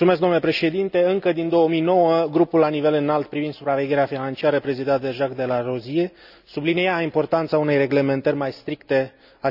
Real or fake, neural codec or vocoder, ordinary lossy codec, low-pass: real; none; none; 5.4 kHz